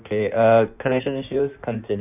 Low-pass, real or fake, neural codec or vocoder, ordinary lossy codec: 3.6 kHz; fake; codec, 16 kHz in and 24 kHz out, 1.1 kbps, FireRedTTS-2 codec; none